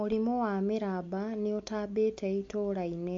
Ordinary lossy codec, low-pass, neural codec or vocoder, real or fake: MP3, 48 kbps; 7.2 kHz; none; real